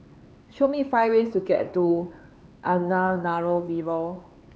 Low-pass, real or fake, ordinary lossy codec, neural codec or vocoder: none; fake; none; codec, 16 kHz, 4 kbps, X-Codec, HuBERT features, trained on LibriSpeech